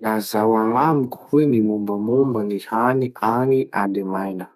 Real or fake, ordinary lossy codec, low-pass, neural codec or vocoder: fake; none; 14.4 kHz; codec, 32 kHz, 1.9 kbps, SNAC